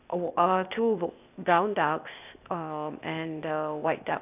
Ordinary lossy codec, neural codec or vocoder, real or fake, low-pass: none; codec, 16 kHz, 0.8 kbps, ZipCodec; fake; 3.6 kHz